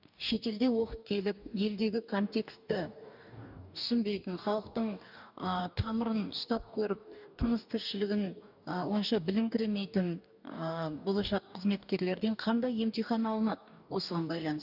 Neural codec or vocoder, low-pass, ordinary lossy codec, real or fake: codec, 44.1 kHz, 2.6 kbps, DAC; 5.4 kHz; none; fake